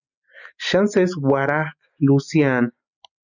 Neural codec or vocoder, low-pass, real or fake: none; 7.2 kHz; real